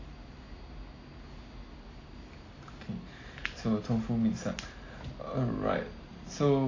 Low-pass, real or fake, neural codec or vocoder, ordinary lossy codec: 7.2 kHz; real; none; AAC, 32 kbps